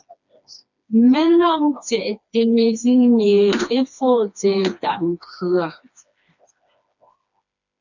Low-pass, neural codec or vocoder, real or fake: 7.2 kHz; codec, 16 kHz, 2 kbps, FreqCodec, smaller model; fake